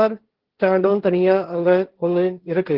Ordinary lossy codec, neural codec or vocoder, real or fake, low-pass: Opus, 16 kbps; codec, 16 kHz, 1.1 kbps, Voila-Tokenizer; fake; 5.4 kHz